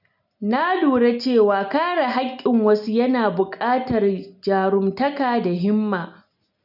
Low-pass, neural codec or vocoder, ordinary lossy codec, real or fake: 5.4 kHz; none; none; real